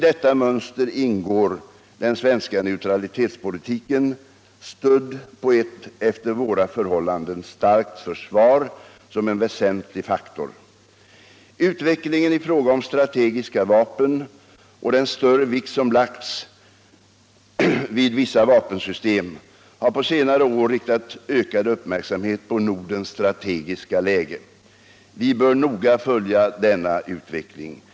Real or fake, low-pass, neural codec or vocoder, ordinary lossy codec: real; none; none; none